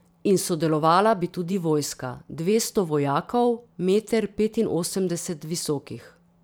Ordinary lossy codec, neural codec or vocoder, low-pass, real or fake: none; vocoder, 44.1 kHz, 128 mel bands every 512 samples, BigVGAN v2; none; fake